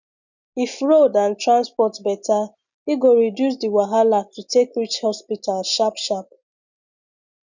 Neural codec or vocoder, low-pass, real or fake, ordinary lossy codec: none; 7.2 kHz; real; none